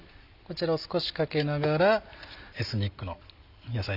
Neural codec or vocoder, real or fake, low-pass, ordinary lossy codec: none; real; 5.4 kHz; none